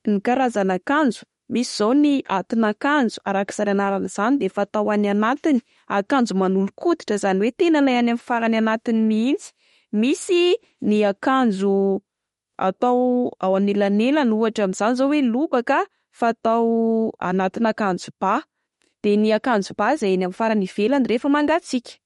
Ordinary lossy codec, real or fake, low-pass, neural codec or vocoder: MP3, 48 kbps; fake; 19.8 kHz; autoencoder, 48 kHz, 32 numbers a frame, DAC-VAE, trained on Japanese speech